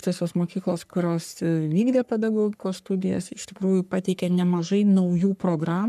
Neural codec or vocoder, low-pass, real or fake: codec, 44.1 kHz, 3.4 kbps, Pupu-Codec; 14.4 kHz; fake